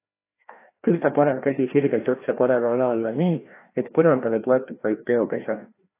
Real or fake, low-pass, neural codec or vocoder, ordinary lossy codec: fake; 3.6 kHz; codec, 16 kHz, 1 kbps, FreqCodec, larger model; AAC, 24 kbps